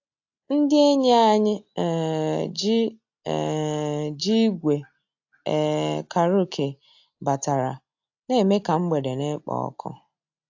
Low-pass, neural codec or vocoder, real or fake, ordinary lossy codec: 7.2 kHz; none; real; AAC, 48 kbps